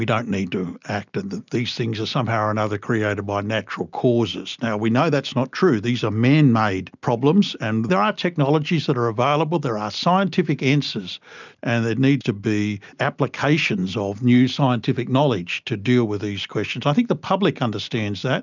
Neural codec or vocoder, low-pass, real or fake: none; 7.2 kHz; real